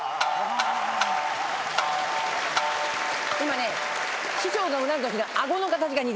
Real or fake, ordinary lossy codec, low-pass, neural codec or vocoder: real; none; none; none